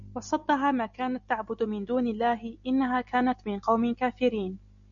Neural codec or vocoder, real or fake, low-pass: none; real; 7.2 kHz